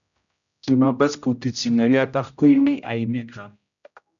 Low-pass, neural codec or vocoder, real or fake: 7.2 kHz; codec, 16 kHz, 0.5 kbps, X-Codec, HuBERT features, trained on general audio; fake